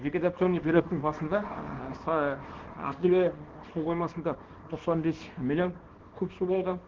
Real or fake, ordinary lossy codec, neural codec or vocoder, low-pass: fake; Opus, 16 kbps; codec, 24 kHz, 0.9 kbps, WavTokenizer, small release; 7.2 kHz